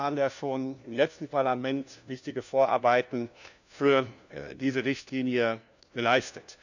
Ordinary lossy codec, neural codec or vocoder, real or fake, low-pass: none; codec, 16 kHz, 1 kbps, FunCodec, trained on LibriTTS, 50 frames a second; fake; 7.2 kHz